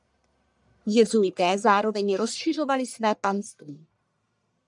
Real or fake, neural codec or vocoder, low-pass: fake; codec, 44.1 kHz, 1.7 kbps, Pupu-Codec; 10.8 kHz